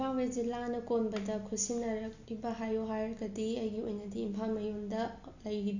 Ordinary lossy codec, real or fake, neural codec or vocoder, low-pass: none; real; none; 7.2 kHz